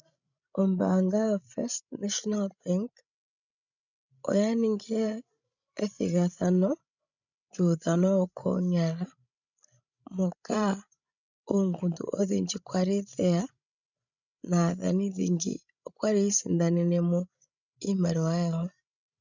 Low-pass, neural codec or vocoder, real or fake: 7.2 kHz; codec, 16 kHz, 16 kbps, FreqCodec, larger model; fake